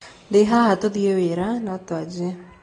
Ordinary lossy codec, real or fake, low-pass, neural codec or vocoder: AAC, 32 kbps; real; 9.9 kHz; none